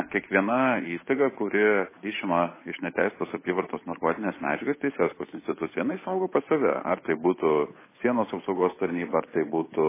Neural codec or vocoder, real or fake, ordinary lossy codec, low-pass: codec, 16 kHz, 8 kbps, FunCodec, trained on Chinese and English, 25 frames a second; fake; MP3, 16 kbps; 3.6 kHz